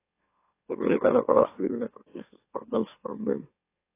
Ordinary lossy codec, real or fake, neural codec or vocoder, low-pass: AAC, 24 kbps; fake; autoencoder, 44.1 kHz, a latent of 192 numbers a frame, MeloTTS; 3.6 kHz